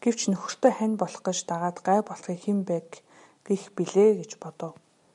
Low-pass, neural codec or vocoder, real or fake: 10.8 kHz; none; real